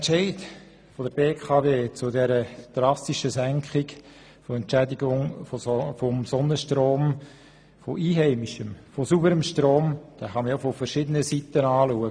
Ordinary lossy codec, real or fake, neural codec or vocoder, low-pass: none; real; none; 9.9 kHz